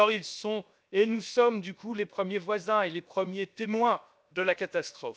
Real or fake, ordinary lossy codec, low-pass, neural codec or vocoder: fake; none; none; codec, 16 kHz, 0.7 kbps, FocalCodec